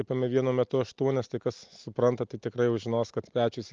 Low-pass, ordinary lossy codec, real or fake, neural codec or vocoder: 7.2 kHz; Opus, 24 kbps; real; none